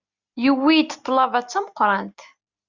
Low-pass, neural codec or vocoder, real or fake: 7.2 kHz; none; real